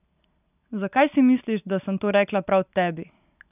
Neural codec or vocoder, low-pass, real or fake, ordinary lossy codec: none; 3.6 kHz; real; none